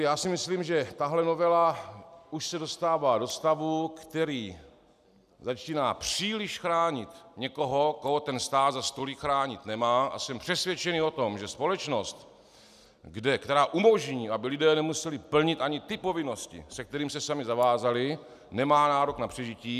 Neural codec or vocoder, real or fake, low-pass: none; real; 14.4 kHz